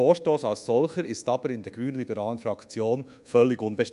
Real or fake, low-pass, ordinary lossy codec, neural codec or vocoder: fake; 10.8 kHz; MP3, 64 kbps; codec, 24 kHz, 1.2 kbps, DualCodec